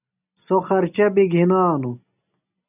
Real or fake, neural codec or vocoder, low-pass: real; none; 3.6 kHz